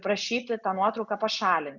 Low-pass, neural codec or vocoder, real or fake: 7.2 kHz; none; real